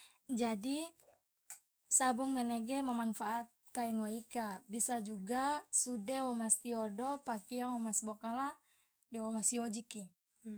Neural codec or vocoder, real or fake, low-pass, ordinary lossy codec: codec, 44.1 kHz, 7.8 kbps, DAC; fake; none; none